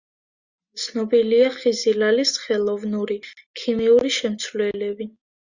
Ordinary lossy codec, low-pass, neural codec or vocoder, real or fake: Opus, 64 kbps; 7.2 kHz; none; real